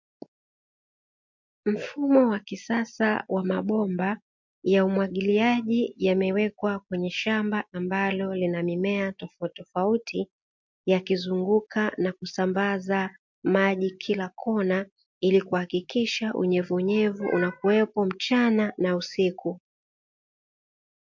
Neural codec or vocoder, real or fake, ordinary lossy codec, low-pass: none; real; MP3, 48 kbps; 7.2 kHz